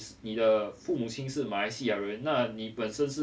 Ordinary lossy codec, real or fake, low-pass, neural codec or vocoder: none; real; none; none